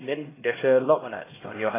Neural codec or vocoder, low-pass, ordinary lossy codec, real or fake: codec, 16 kHz, 1 kbps, X-Codec, HuBERT features, trained on LibriSpeech; 3.6 kHz; AAC, 16 kbps; fake